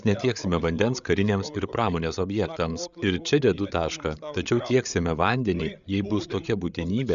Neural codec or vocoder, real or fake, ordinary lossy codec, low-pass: codec, 16 kHz, 8 kbps, FreqCodec, larger model; fake; MP3, 96 kbps; 7.2 kHz